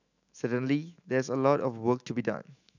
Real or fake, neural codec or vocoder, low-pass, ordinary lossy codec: fake; autoencoder, 48 kHz, 128 numbers a frame, DAC-VAE, trained on Japanese speech; 7.2 kHz; none